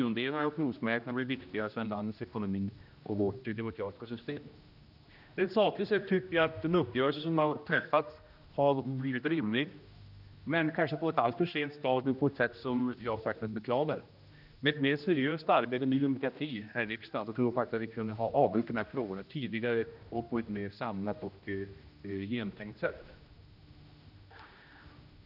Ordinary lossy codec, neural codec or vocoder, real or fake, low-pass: none; codec, 16 kHz, 1 kbps, X-Codec, HuBERT features, trained on general audio; fake; 5.4 kHz